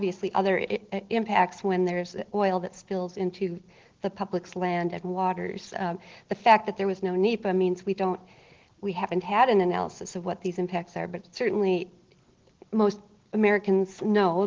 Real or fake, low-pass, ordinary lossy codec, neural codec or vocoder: real; 7.2 kHz; Opus, 16 kbps; none